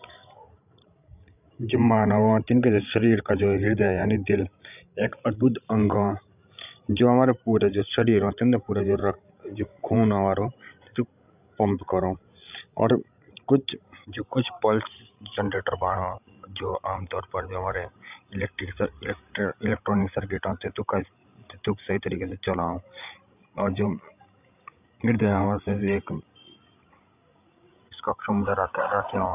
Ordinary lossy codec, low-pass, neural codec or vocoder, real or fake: none; 3.6 kHz; codec, 16 kHz, 16 kbps, FreqCodec, larger model; fake